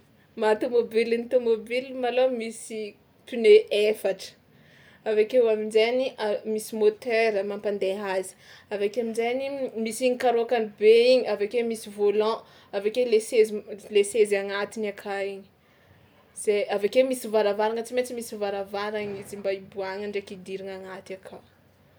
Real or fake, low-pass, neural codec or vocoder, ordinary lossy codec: real; none; none; none